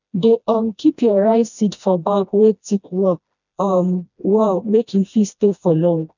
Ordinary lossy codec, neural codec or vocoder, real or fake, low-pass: none; codec, 16 kHz, 1 kbps, FreqCodec, smaller model; fake; 7.2 kHz